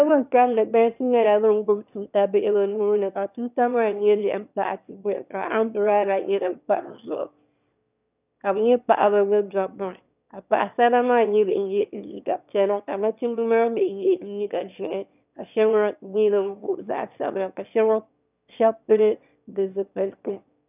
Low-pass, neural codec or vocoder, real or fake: 3.6 kHz; autoencoder, 22.05 kHz, a latent of 192 numbers a frame, VITS, trained on one speaker; fake